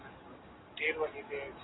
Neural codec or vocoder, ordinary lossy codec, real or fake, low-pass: none; AAC, 16 kbps; real; 7.2 kHz